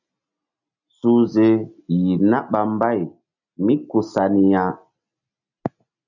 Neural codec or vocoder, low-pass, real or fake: none; 7.2 kHz; real